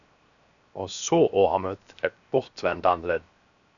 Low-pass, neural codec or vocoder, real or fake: 7.2 kHz; codec, 16 kHz, 0.7 kbps, FocalCodec; fake